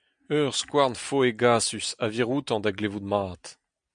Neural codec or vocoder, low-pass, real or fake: none; 9.9 kHz; real